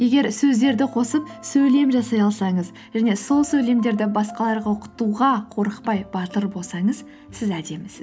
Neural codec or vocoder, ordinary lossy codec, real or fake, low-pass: none; none; real; none